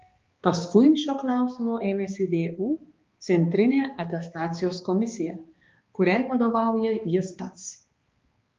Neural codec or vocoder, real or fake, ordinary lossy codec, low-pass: codec, 16 kHz, 2 kbps, X-Codec, HuBERT features, trained on balanced general audio; fake; Opus, 32 kbps; 7.2 kHz